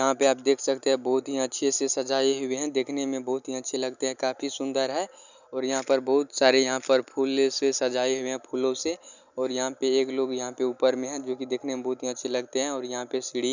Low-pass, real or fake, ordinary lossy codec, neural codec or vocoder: 7.2 kHz; real; none; none